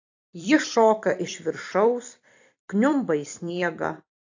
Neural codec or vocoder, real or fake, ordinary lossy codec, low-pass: vocoder, 44.1 kHz, 128 mel bands every 256 samples, BigVGAN v2; fake; AAC, 48 kbps; 7.2 kHz